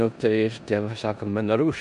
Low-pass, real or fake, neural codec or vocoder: 10.8 kHz; fake; codec, 16 kHz in and 24 kHz out, 0.9 kbps, LongCat-Audio-Codec, four codebook decoder